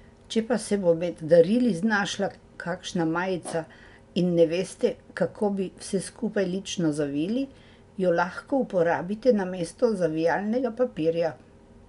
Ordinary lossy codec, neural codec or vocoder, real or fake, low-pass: MP3, 64 kbps; none; real; 10.8 kHz